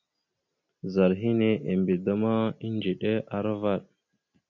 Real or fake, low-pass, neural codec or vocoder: real; 7.2 kHz; none